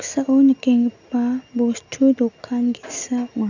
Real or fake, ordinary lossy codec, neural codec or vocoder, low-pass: real; none; none; 7.2 kHz